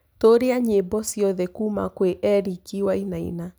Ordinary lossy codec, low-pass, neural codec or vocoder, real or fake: none; none; none; real